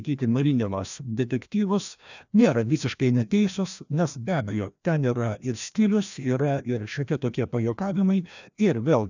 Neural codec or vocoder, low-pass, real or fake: codec, 16 kHz, 1 kbps, FreqCodec, larger model; 7.2 kHz; fake